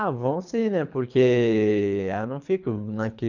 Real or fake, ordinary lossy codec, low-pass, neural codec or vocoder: fake; none; 7.2 kHz; codec, 24 kHz, 3 kbps, HILCodec